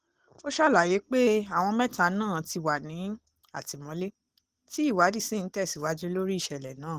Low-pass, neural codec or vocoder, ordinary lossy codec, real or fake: 14.4 kHz; none; Opus, 16 kbps; real